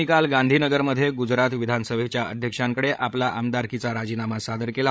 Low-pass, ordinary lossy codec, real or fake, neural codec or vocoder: none; none; fake; codec, 16 kHz, 16 kbps, FreqCodec, larger model